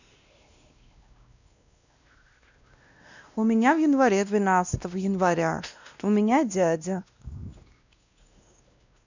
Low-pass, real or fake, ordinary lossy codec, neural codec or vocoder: 7.2 kHz; fake; none; codec, 16 kHz, 1 kbps, X-Codec, WavLM features, trained on Multilingual LibriSpeech